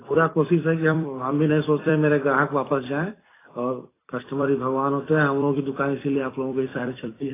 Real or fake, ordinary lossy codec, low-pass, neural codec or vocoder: fake; AAC, 16 kbps; 3.6 kHz; vocoder, 44.1 kHz, 128 mel bands every 256 samples, BigVGAN v2